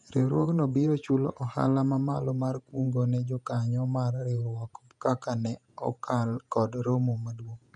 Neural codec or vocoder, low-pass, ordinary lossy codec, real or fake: vocoder, 48 kHz, 128 mel bands, Vocos; 10.8 kHz; none; fake